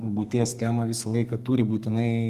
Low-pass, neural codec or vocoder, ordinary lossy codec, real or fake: 14.4 kHz; codec, 32 kHz, 1.9 kbps, SNAC; Opus, 32 kbps; fake